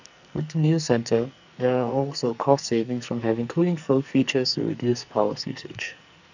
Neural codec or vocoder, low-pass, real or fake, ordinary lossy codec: codec, 44.1 kHz, 2.6 kbps, SNAC; 7.2 kHz; fake; none